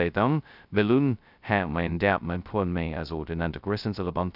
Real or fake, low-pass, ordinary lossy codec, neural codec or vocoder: fake; 5.4 kHz; none; codec, 16 kHz, 0.2 kbps, FocalCodec